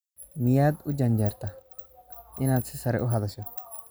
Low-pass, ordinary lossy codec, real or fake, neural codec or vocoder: none; none; real; none